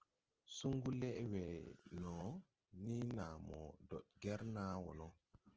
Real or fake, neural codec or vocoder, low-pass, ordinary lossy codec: real; none; 7.2 kHz; Opus, 16 kbps